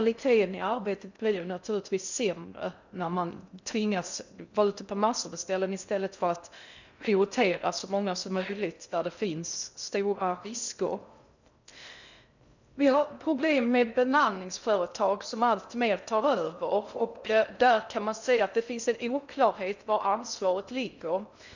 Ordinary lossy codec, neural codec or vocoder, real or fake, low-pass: none; codec, 16 kHz in and 24 kHz out, 0.6 kbps, FocalCodec, streaming, 2048 codes; fake; 7.2 kHz